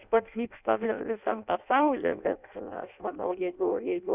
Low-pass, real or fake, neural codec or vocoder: 3.6 kHz; fake; codec, 16 kHz in and 24 kHz out, 0.6 kbps, FireRedTTS-2 codec